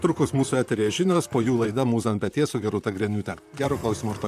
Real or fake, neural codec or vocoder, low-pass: fake; vocoder, 44.1 kHz, 128 mel bands, Pupu-Vocoder; 14.4 kHz